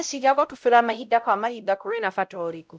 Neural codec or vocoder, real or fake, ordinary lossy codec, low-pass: codec, 16 kHz, 0.5 kbps, X-Codec, WavLM features, trained on Multilingual LibriSpeech; fake; none; none